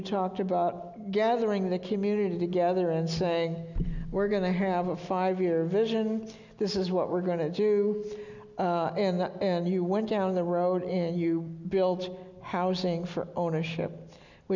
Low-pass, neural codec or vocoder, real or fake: 7.2 kHz; none; real